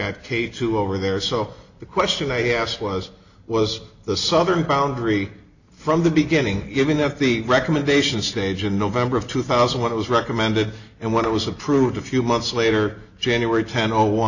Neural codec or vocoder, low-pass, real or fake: none; 7.2 kHz; real